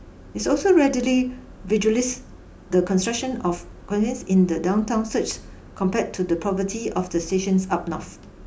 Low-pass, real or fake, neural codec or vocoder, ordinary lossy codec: none; real; none; none